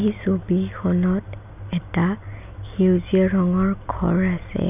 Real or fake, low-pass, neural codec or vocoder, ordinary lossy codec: real; 3.6 kHz; none; none